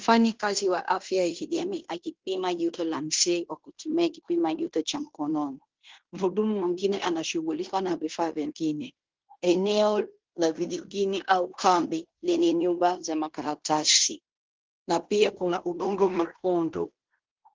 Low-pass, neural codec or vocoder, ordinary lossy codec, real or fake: 7.2 kHz; codec, 16 kHz in and 24 kHz out, 0.9 kbps, LongCat-Audio-Codec, fine tuned four codebook decoder; Opus, 16 kbps; fake